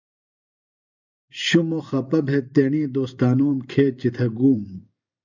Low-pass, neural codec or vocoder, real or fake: 7.2 kHz; vocoder, 44.1 kHz, 128 mel bands every 512 samples, BigVGAN v2; fake